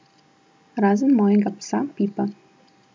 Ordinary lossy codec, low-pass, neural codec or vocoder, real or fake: none; none; none; real